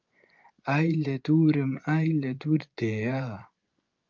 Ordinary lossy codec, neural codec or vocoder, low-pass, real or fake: Opus, 24 kbps; vocoder, 24 kHz, 100 mel bands, Vocos; 7.2 kHz; fake